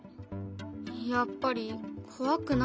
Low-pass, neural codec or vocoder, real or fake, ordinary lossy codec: none; none; real; none